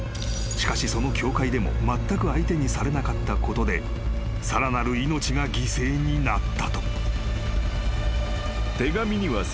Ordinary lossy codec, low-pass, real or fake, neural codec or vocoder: none; none; real; none